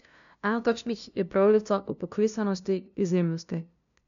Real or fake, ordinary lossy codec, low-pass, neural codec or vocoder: fake; none; 7.2 kHz; codec, 16 kHz, 0.5 kbps, FunCodec, trained on LibriTTS, 25 frames a second